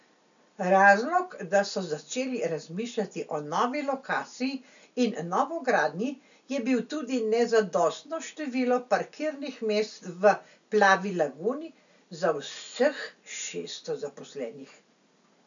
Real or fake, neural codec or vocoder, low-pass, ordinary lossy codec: real; none; 7.2 kHz; none